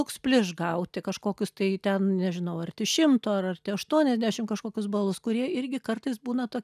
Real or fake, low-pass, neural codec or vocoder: real; 14.4 kHz; none